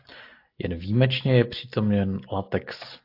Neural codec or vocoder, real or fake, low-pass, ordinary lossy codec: none; real; 5.4 kHz; AAC, 32 kbps